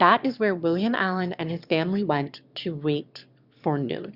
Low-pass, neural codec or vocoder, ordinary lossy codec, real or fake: 5.4 kHz; autoencoder, 22.05 kHz, a latent of 192 numbers a frame, VITS, trained on one speaker; Opus, 64 kbps; fake